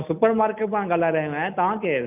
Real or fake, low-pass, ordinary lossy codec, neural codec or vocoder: real; 3.6 kHz; none; none